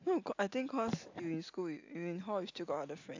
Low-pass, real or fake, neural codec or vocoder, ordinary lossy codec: 7.2 kHz; real; none; AAC, 48 kbps